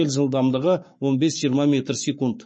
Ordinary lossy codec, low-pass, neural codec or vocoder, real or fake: MP3, 32 kbps; 9.9 kHz; codec, 44.1 kHz, 7.8 kbps, Pupu-Codec; fake